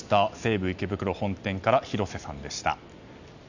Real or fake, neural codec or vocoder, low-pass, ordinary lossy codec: real; none; 7.2 kHz; none